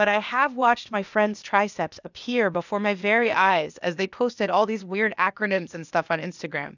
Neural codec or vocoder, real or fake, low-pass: codec, 16 kHz, 0.8 kbps, ZipCodec; fake; 7.2 kHz